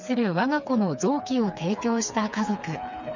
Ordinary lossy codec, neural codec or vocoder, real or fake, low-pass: none; codec, 16 kHz, 4 kbps, FreqCodec, smaller model; fake; 7.2 kHz